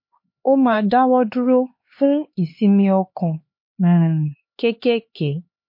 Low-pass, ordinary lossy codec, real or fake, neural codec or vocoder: 5.4 kHz; MP3, 32 kbps; fake; codec, 16 kHz, 4 kbps, X-Codec, HuBERT features, trained on LibriSpeech